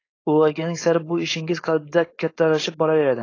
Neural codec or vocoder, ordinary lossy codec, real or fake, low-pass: codec, 16 kHz, 4.8 kbps, FACodec; AAC, 32 kbps; fake; 7.2 kHz